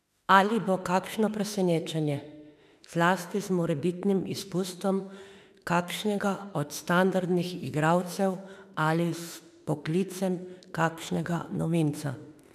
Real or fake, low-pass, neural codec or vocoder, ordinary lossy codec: fake; 14.4 kHz; autoencoder, 48 kHz, 32 numbers a frame, DAC-VAE, trained on Japanese speech; none